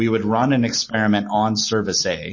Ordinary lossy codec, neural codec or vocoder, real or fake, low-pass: MP3, 32 kbps; none; real; 7.2 kHz